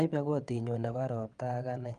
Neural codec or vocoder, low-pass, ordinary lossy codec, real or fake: vocoder, 22.05 kHz, 80 mel bands, WaveNeXt; 9.9 kHz; Opus, 32 kbps; fake